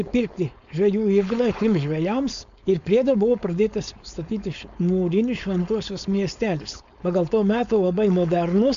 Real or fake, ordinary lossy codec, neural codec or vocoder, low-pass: fake; MP3, 64 kbps; codec, 16 kHz, 4.8 kbps, FACodec; 7.2 kHz